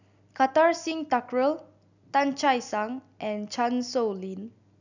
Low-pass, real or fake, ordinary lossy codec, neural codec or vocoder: 7.2 kHz; real; none; none